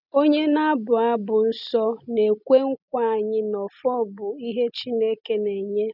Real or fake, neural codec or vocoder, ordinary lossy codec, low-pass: real; none; none; 5.4 kHz